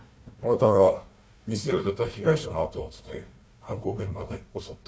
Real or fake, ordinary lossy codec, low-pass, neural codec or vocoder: fake; none; none; codec, 16 kHz, 1 kbps, FunCodec, trained on Chinese and English, 50 frames a second